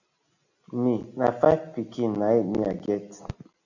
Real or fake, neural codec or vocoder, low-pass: real; none; 7.2 kHz